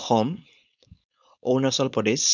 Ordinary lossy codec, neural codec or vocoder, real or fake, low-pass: none; codec, 16 kHz, 4.8 kbps, FACodec; fake; 7.2 kHz